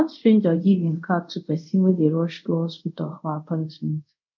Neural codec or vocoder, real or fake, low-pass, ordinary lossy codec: codec, 24 kHz, 0.5 kbps, DualCodec; fake; 7.2 kHz; none